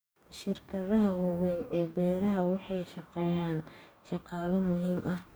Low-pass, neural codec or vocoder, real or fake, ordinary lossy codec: none; codec, 44.1 kHz, 2.6 kbps, DAC; fake; none